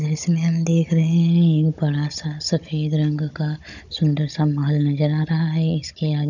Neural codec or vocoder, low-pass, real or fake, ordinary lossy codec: codec, 16 kHz, 16 kbps, FunCodec, trained on Chinese and English, 50 frames a second; 7.2 kHz; fake; none